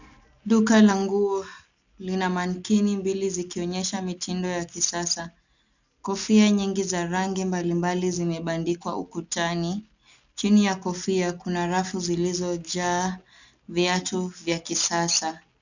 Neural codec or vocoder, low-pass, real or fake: none; 7.2 kHz; real